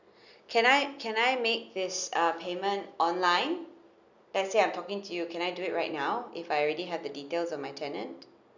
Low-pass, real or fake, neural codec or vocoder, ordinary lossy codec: 7.2 kHz; real; none; none